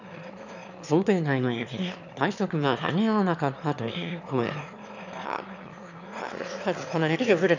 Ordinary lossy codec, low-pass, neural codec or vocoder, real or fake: none; 7.2 kHz; autoencoder, 22.05 kHz, a latent of 192 numbers a frame, VITS, trained on one speaker; fake